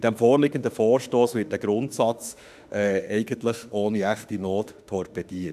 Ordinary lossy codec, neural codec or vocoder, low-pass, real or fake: none; autoencoder, 48 kHz, 32 numbers a frame, DAC-VAE, trained on Japanese speech; 14.4 kHz; fake